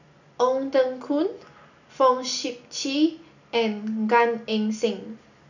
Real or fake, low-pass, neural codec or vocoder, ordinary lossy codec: real; 7.2 kHz; none; none